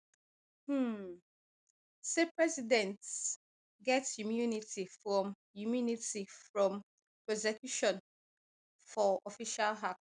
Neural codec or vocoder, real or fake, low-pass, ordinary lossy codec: none; real; 9.9 kHz; none